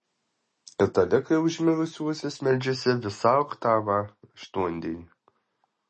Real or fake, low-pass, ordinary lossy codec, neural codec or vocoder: real; 10.8 kHz; MP3, 32 kbps; none